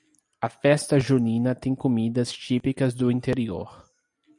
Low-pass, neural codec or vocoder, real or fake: 10.8 kHz; none; real